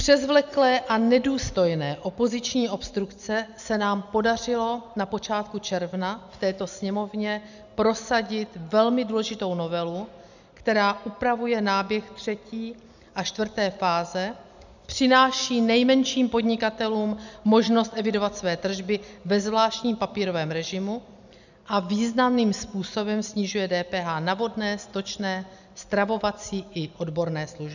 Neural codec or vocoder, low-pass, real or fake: none; 7.2 kHz; real